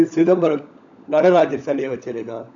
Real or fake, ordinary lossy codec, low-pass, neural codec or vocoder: fake; none; 7.2 kHz; codec, 16 kHz, 16 kbps, FunCodec, trained on LibriTTS, 50 frames a second